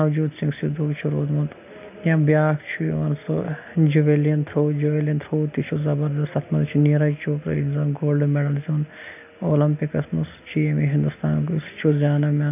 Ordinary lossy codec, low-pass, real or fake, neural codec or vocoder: none; 3.6 kHz; real; none